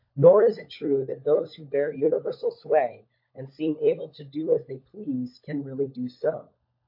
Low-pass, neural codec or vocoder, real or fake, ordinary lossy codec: 5.4 kHz; codec, 16 kHz, 16 kbps, FunCodec, trained on LibriTTS, 50 frames a second; fake; MP3, 32 kbps